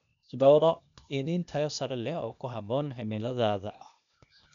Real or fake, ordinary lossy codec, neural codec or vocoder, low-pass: fake; MP3, 96 kbps; codec, 16 kHz, 0.8 kbps, ZipCodec; 7.2 kHz